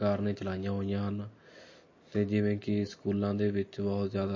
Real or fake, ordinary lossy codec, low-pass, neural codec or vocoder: real; MP3, 32 kbps; 7.2 kHz; none